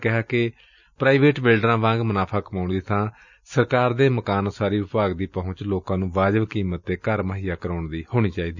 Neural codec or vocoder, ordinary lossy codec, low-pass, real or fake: none; none; 7.2 kHz; real